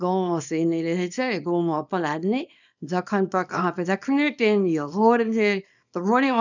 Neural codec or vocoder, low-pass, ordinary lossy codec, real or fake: codec, 24 kHz, 0.9 kbps, WavTokenizer, small release; 7.2 kHz; none; fake